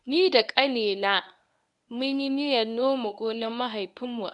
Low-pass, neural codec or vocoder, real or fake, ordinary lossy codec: 10.8 kHz; codec, 24 kHz, 0.9 kbps, WavTokenizer, medium speech release version 1; fake; none